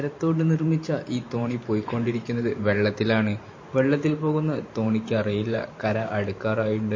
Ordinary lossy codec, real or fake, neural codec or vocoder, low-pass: MP3, 32 kbps; fake; vocoder, 44.1 kHz, 128 mel bands every 512 samples, BigVGAN v2; 7.2 kHz